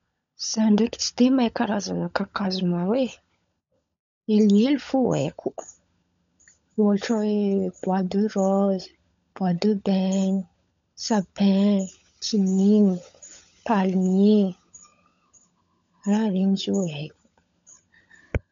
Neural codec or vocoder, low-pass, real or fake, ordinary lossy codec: codec, 16 kHz, 16 kbps, FunCodec, trained on LibriTTS, 50 frames a second; 7.2 kHz; fake; none